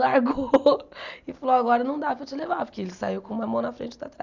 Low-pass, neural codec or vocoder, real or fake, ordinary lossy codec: 7.2 kHz; none; real; none